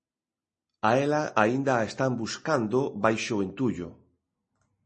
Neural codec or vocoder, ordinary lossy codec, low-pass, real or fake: none; MP3, 32 kbps; 10.8 kHz; real